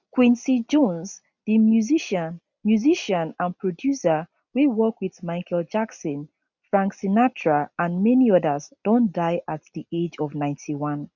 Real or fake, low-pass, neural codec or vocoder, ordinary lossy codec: real; 7.2 kHz; none; none